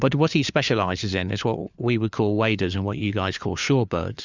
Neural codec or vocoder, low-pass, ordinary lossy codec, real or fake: codec, 16 kHz, 4 kbps, FunCodec, trained on LibriTTS, 50 frames a second; 7.2 kHz; Opus, 64 kbps; fake